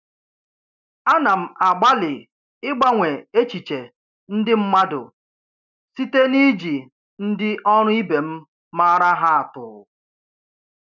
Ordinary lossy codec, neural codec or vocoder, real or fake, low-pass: none; none; real; 7.2 kHz